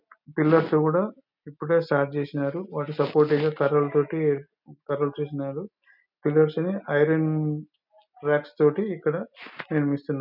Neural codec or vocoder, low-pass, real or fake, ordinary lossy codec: none; 5.4 kHz; real; none